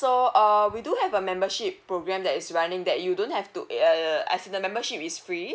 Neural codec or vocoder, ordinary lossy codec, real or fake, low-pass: none; none; real; none